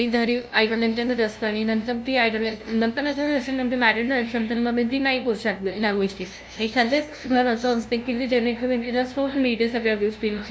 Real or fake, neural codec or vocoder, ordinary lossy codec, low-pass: fake; codec, 16 kHz, 0.5 kbps, FunCodec, trained on LibriTTS, 25 frames a second; none; none